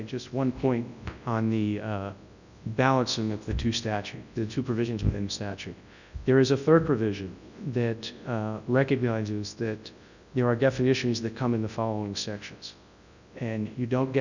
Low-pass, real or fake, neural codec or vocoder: 7.2 kHz; fake; codec, 24 kHz, 0.9 kbps, WavTokenizer, large speech release